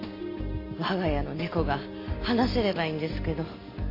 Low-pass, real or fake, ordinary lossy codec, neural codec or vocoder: 5.4 kHz; real; AAC, 32 kbps; none